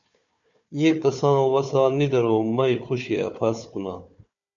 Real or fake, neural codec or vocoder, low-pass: fake; codec, 16 kHz, 4 kbps, FunCodec, trained on Chinese and English, 50 frames a second; 7.2 kHz